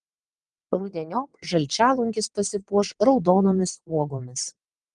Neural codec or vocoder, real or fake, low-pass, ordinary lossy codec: vocoder, 22.05 kHz, 80 mel bands, WaveNeXt; fake; 9.9 kHz; Opus, 24 kbps